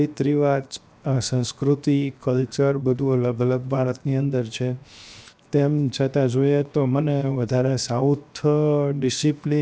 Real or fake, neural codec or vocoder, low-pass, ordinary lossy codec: fake; codec, 16 kHz, 0.7 kbps, FocalCodec; none; none